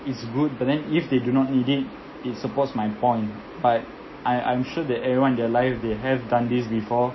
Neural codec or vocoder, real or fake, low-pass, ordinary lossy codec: none; real; 7.2 kHz; MP3, 24 kbps